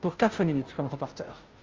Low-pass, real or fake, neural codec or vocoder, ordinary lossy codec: 7.2 kHz; fake; codec, 16 kHz, 0.5 kbps, FunCodec, trained on Chinese and English, 25 frames a second; Opus, 32 kbps